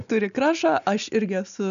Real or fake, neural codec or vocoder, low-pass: real; none; 7.2 kHz